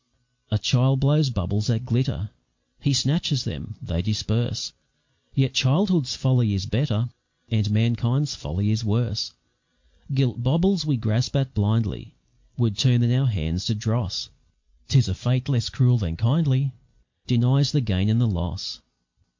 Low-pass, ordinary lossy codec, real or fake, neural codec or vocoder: 7.2 kHz; MP3, 48 kbps; real; none